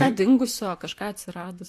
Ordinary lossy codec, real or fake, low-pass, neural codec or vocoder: AAC, 64 kbps; fake; 14.4 kHz; vocoder, 44.1 kHz, 128 mel bands, Pupu-Vocoder